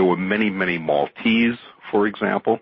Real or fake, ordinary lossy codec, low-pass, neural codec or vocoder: real; MP3, 24 kbps; 7.2 kHz; none